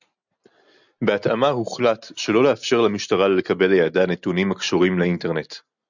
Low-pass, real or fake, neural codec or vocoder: 7.2 kHz; real; none